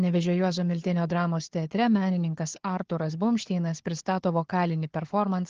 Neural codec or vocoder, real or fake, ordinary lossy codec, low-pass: codec, 16 kHz, 4 kbps, FunCodec, trained on Chinese and English, 50 frames a second; fake; Opus, 16 kbps; 7.2 kHz